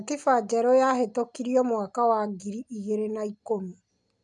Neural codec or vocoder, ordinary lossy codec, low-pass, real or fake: none; none; 10.8 kHz; real